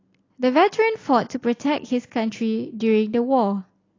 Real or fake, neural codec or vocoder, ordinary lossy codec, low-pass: real; none; AAC, 32 kbps; 7.2 kHz